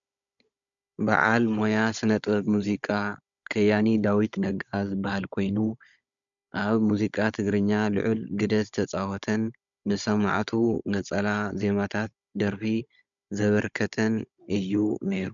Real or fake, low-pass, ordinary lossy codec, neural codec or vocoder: fake; 7.2 kHz; MP3, 96 kbps; codec, 16 kHz, 16 kbps, FunCodec, trained on Chinese and English, 50 frames a second